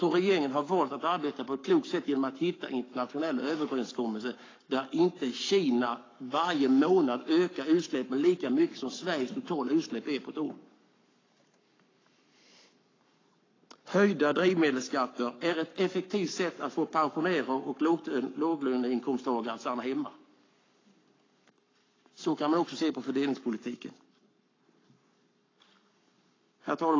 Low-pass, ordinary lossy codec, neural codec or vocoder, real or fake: 7.2 kHz; AAC, 32 kbps; codec, 44.1 kHz, 7.8 kbps, Pupu-Codec; fake